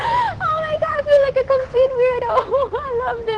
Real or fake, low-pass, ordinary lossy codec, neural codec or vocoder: fake; 9.9 kHz; Opus, 16 kbps; vocoder, 44.1 kHz, 128 mel bands every 512 samples, BigVGAN v2